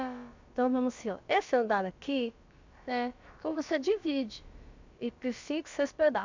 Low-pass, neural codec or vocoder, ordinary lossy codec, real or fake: 7.2 kHz; codec, 16 kHz, about 1 kbps, DyCAST, with the encoder's durations; MP3, 64 kbps; fake